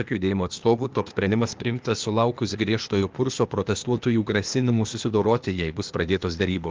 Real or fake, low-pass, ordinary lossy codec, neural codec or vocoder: fake; 7.2 kHz; Opus, 24 kbps; codec, 16 kHz, 0.8 kbps, ZipCodec